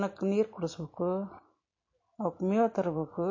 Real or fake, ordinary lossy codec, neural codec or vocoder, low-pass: real; MP3, 32 kbps; none; 7.2 kHz